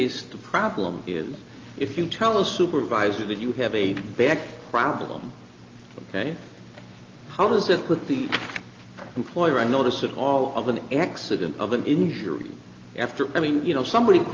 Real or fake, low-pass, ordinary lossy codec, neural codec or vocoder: real; 7.2 kHz; Opus, 32 kbps; none